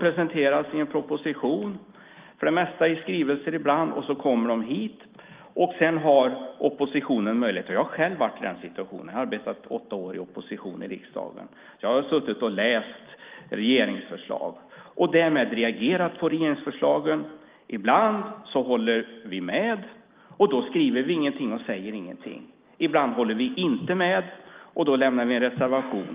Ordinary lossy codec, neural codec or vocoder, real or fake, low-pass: Opus, 24 kbps; none; real; 3.6 kHz